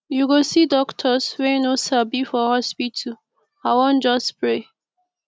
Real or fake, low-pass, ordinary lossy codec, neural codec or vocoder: real; none; none; none